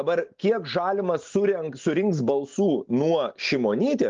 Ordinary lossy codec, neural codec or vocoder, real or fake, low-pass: Opus, 32 kbps; none; real; 7.2 kHz